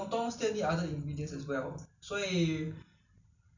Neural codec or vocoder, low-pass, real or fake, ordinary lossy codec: none; 7.2 kHz; real; none